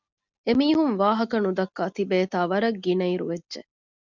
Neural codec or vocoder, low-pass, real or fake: none; 7.2 kHz; real